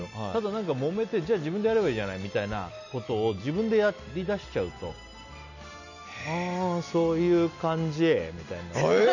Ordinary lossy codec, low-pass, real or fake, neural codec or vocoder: none; 7.2 kHz; real; none